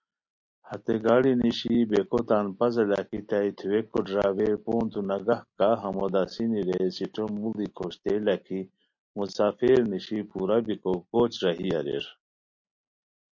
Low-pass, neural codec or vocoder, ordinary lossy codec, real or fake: 7.2 kHz; none; MP3, 48 kbps; real